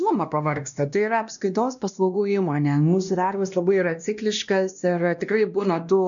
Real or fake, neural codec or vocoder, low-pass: fake; codec, 16 kHz, 1 kbps, X-Codec, WavLM features, trained on Multilingual LibriSpeech; 7.2 kHz